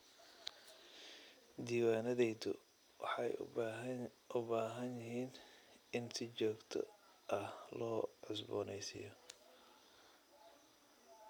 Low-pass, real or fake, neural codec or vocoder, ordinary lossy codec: none; real; none; none